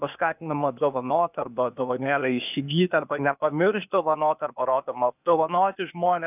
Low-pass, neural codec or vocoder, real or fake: 3.6 kHz; codec, 16 kHz, 0.8 kbps, ZipCodec; fake